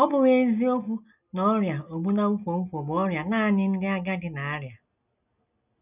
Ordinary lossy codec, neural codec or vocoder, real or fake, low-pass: none; none; real; 3.6 kHz